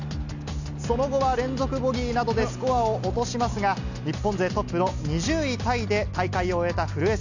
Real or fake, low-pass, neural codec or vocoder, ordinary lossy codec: real; 7.2 kHz; none; none